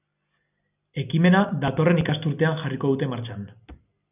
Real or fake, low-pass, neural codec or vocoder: real; 3.6 kHz; none